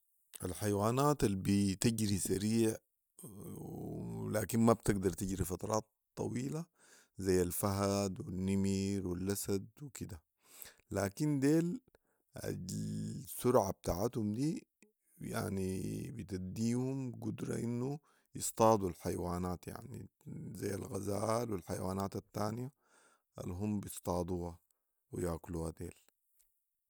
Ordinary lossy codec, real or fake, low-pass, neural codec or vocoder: none; real; none; none